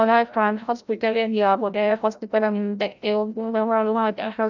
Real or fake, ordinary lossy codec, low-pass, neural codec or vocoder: fake; none; 7.2 kHz; codec, 16 kHz, 0.5 kbps, FreqCodec, larger model